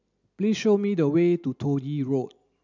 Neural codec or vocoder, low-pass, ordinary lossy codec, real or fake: none; 7.2 kHz; none; real